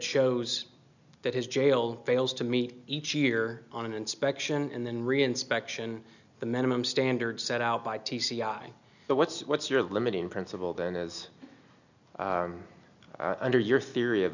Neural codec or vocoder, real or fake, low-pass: none; real; 7.2 kHz